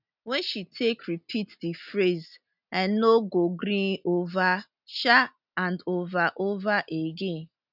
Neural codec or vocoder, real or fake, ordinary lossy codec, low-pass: none; real; none; 5.4 kHz